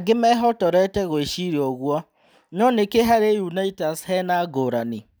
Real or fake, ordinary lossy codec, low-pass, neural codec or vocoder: real; none; none; none